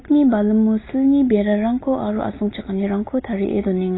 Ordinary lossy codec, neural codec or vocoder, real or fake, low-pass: AAC, 16 kbps; none; real; 7.2 kHz